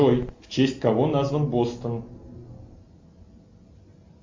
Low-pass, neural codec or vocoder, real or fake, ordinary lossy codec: 7.2 kHz; none; real; MP3, 48 kbps